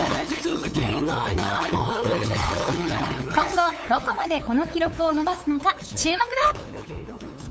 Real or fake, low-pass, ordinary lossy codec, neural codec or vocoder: fake; none; none; codec, 16 kHz, 8 kbps, FunCodec, trained on LibriTTS, 25 frames a second